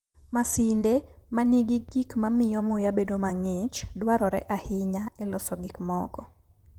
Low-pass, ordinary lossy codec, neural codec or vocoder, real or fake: 19.8 kHz; Opus, 32 kbps; vocoder, 44.1 kHz, 128 mel bands, Pupu-Vocoder; fake